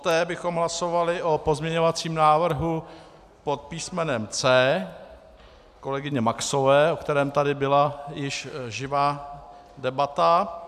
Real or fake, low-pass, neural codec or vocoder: real; 14.4 kHz; none